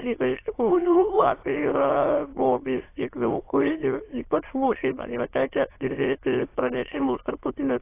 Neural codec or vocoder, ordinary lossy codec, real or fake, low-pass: autoencoder, 22.05 kHz, a latent of 192 numbers a frame, VITS, trained on many speakers; AAC, 24 kbps; fake; 3.6 kHz